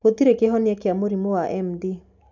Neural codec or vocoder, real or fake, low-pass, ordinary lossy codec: none; real; 7.2 kHz; none